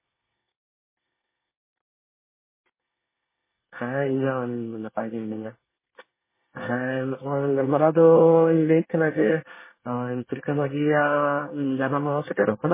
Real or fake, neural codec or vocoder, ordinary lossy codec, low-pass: fake; codec, 24 kHz, 1 kbps, SNAC; MP3, 16 kbps; 3.6 kHz